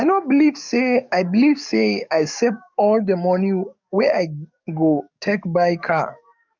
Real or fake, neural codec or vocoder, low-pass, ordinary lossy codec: fake; codec, 44.1 kHz, 7.8 kbps, DAC; 7.2 kHz; none